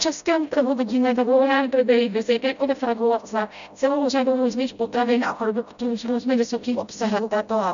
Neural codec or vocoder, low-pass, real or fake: codec, 16 kHz, 0.5 kbps, FreqCodec, smaller model; 7.2 kHz; fake